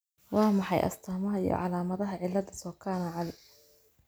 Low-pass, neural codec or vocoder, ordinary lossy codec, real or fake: none; none; none; real